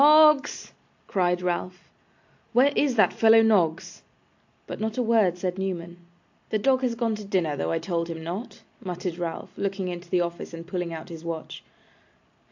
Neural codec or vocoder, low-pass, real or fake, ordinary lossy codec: none; 7.2 kHz; real; AAC, 48 kbps